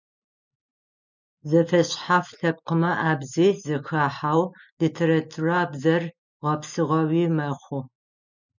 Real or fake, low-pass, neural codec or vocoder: real; 7.2 kHz; none